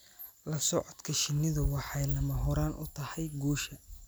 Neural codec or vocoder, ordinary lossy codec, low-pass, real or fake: none; none; none; real